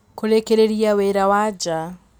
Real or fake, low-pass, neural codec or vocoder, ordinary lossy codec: real; 19.8 kHz; none; none